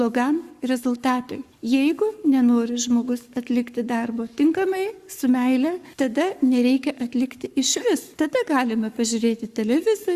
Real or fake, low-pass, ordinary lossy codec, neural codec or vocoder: fake; 14.4 kHz; Opus, 64 kbps; codec, 44.1 kHz, 7.8 kbps, Pupu-Codec